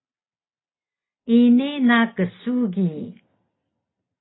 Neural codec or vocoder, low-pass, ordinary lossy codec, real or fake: none; 7.2 kHz; AAC, 16 kbps; real